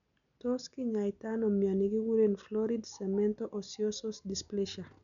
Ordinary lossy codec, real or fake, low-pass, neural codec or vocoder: none; real; 7.2 kHz; none